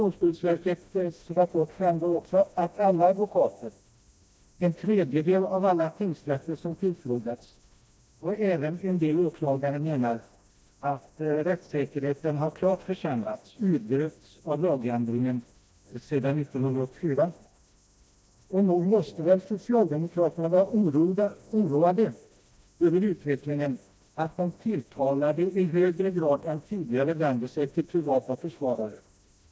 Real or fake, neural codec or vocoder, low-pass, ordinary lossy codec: fake; codec, 16 kHz, 1 kbps, FreqCodec, smaller model; none; none